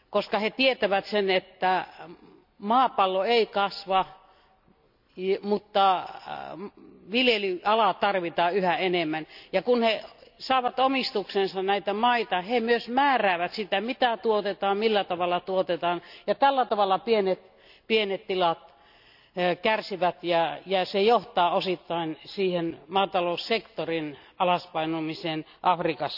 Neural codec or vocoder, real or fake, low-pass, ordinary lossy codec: none; real; 5.4 kHz; none